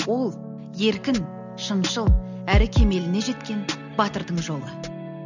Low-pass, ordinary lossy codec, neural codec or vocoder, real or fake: 7.2 kHz; none; none; real